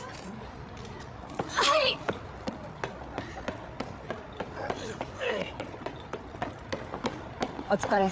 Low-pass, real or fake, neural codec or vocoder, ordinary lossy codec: none; fake; codec, 16 kHz, 8 kbps, FreqCodec, larger model; none